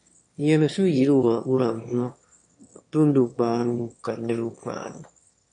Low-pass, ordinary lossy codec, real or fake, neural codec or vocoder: 9.9 kHz; MP3, 48 kbps; fake; autoencoder, 22.05 kHz, a latent of 192 numbers a frame, VITS, trained on one speaker